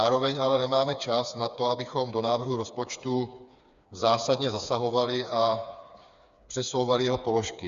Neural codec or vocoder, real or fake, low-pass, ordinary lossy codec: codec, 16 kHz, 4 kbps, FreqCodec, smaller model; fake; 7.2 kHz; Opus, 64 kbps